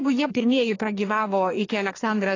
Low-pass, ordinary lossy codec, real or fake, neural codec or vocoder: 7.2 kHz; AAC, 32 kbps; fake; codec, 44.1 kHz, 2.6 kbps, SNAC